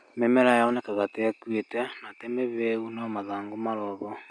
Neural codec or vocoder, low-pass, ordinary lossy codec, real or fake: none; 9.9 kHz; none; real